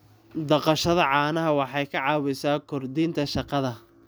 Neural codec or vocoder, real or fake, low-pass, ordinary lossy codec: none; real; none; none